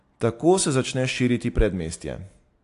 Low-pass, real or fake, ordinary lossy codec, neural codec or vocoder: 10.8 kHz; real; AAC, 64 kbps; none